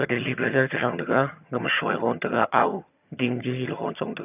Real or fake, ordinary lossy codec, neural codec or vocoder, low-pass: fake; none; vocoder, 22.05 kHz, 80 mel bands, HiFi-GAN; 3.6 kHz